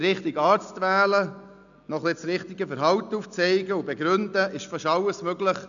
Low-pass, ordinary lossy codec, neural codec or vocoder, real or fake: 7.2 kHz; none; none; real